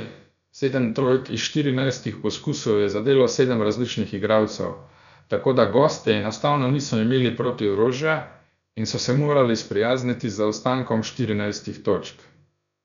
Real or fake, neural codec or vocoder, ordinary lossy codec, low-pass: fake; codec, 16 kHz, about 1 kbps, DyCAST, with the encoder's durations; none; 7.2 kHz